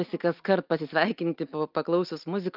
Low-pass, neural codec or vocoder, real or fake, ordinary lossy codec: 5.4 kHz; none; real; Opus, 24 kbps